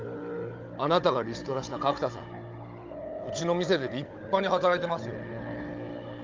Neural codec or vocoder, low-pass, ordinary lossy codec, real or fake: codec, 16 kHz, 16 kbps, FunCodec, trained on Chinese and English, 50 frames a second; 7.2 kHz; Opus, 24 kbps; fake